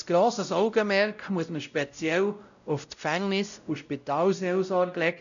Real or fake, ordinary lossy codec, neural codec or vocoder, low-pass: fake; none; codec, 16 kHz, 0.5 kbps, X-Codec, WavLM features, trained on Multilingual LibriSpeech; 7.2 kHz